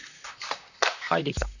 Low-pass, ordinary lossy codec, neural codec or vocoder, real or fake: 7.2 kHz; none; vocoder, 44.1 kHz, 128 mel bands, Pupu-Vocoder; fake